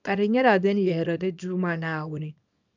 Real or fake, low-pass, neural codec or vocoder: fake; 7.2 kHz; codec, 24 kHz, 0.9 kbps, WavTokenizer, small release